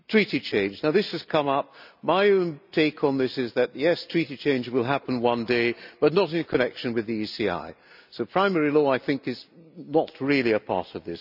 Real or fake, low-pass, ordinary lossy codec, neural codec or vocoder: real; 5.4 kHz; none; none